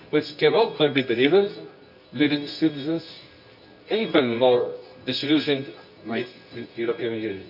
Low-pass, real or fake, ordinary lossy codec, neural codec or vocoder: 5.4 kHz; fake; none; codec, 24 kHz, 0.9 kbps, WavTokenizer, medium music audio release